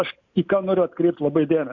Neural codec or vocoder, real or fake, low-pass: none; real; 7.2 kHz